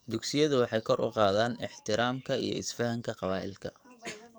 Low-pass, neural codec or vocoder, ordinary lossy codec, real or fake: none; codec, 44.1 kHz, 7.8 kbps, DAC; none; fake